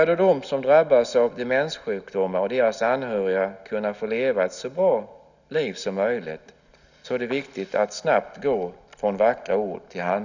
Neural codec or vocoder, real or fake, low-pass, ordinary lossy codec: none; real; 7.2 kHz; none